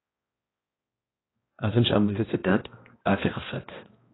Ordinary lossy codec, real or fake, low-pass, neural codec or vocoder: AAC, 16 kbps; fake; 7.2 kHz; codec, 16 kHz, 1 kbps, X-Codec, HuBERT features, trained on balanced general audio